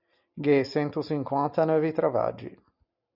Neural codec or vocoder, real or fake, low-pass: none; real; 5.4 kHz